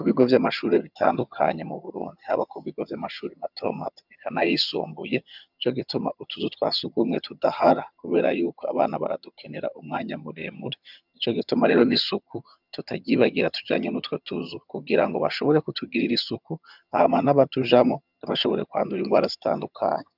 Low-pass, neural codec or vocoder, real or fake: 5.4 kHz; vocoder, 22.05 kHz, 80 mel bands, HiFi-GAN; fake